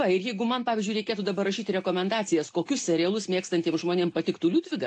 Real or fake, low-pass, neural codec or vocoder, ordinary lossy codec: real; 9.9 kHz; none; AAC, 48 kbps